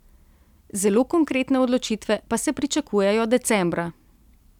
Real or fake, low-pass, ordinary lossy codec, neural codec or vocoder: real; 19.8 kHz; none; none